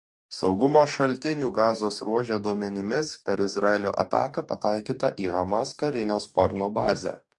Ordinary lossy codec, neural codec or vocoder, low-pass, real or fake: MP3, 64 kbps; codec, 44.1 kHz, 2.6 kbps, DAC; 10.8 kHz; fake